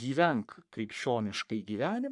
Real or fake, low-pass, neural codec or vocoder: fake; 10.8 kHz; codec, 44.1 kHz, 3.4 kbps, Pupu-Codec